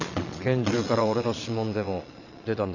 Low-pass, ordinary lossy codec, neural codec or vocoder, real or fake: 7.2 kHz; none; vocoder, 22.05 kHz, 80 mel bands, Vocos; fake